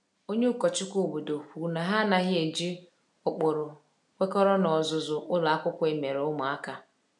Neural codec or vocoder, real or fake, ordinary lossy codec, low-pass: none; real; none; 10.8 kHz